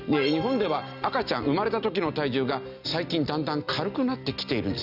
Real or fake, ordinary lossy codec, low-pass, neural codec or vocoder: real; none; 5.4 kHz; none